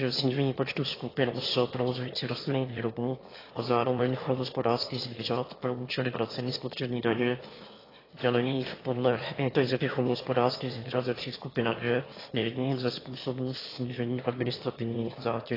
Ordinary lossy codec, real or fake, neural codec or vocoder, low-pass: AAC, 24 kbps; fake; autoencoder, 22.05 kHz, a latent of 192 numbers a frame, VITS, trained on one speaker; 5.4 kHz